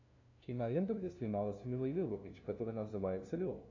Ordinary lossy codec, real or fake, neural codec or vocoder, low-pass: AAC, 48 kbps; fake; codec, 16 kHz, 0.5 kbps, FunCodec, trained on LibriTTS, 25 frames a second; 7.2 kHz